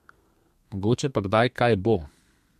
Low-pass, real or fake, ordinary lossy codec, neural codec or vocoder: 14.4 kHz; fake; MP3, 64 kbps; codec, 32 kHz, 1.9 kbps, SNAC